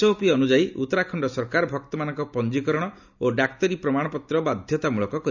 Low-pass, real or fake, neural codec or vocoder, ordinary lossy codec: 7.2 kHz; real; none; none